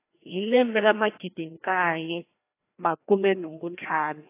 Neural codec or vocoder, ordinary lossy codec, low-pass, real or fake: codec, 16 kHz, 1 kbps, FreqCodec, larger model; AAC, 24 kbps; 3.6 kHz; fake